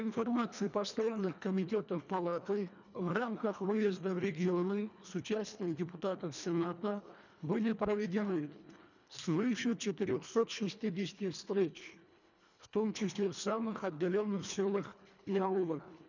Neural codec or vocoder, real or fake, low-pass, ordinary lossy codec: codec, 24 kHz, 1.5 kbps, HILCodec; fake; 7.2 kHz; none